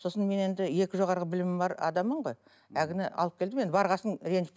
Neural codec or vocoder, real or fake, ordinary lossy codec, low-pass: none; real; none; none